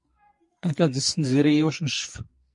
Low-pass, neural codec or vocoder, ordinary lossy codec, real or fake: 10.8 kHz; codec, 44.1 kHz, 2.6 kbps, SNAC; MP3, 48 kbps; fake